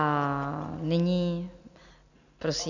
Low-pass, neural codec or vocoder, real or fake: 7.2 kHz; none; real